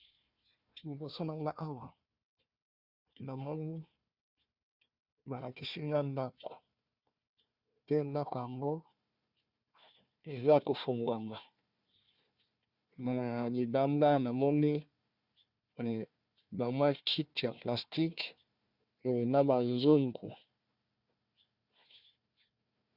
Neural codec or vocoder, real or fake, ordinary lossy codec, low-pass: codec, 16 kHz, 1 kbps, FunCodec, trained on Chinese and English, 50 frames a second; fake; Opus, 64 kbps; 5.4 kHz